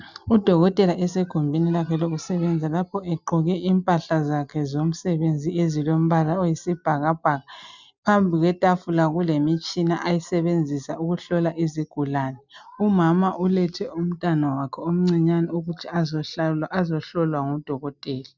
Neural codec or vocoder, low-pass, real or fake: none; 7.2 kHz; real